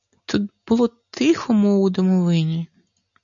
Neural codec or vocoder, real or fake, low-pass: none; real; 7.2 kHz